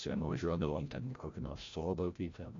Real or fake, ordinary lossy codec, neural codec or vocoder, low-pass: fake; AAC, 48 kbps; codec, 16 kHz, 0.5 kbps, FreqCodec, larger model; 7.2 kHz